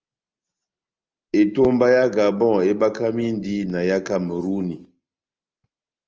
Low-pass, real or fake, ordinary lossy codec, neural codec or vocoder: 7.2 kHz; real; Opus, 24 kbps; none